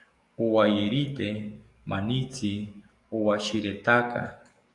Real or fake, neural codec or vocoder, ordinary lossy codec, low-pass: fake; codec, 44.1 kHz, 7.8 kbps, DAC; Opus, 64 kbps; 10.8 kHz